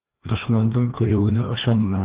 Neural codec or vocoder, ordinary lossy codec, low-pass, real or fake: codec, 16 kHz, 1 kbps, FreqCodec, larger model; Opus, 16 kbps; 3.6 kHz; fake